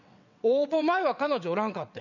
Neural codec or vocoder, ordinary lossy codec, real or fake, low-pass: vocoder, 22.05 kHz, 80 mel bands, WaveNeXt; none; fake; 7.2 kHz